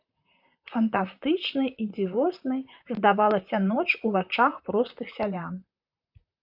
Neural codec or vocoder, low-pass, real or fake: vocoder, 44.1 kHz, 128 mel bands, Pupu-Vocoder; 5.4 kHz; fake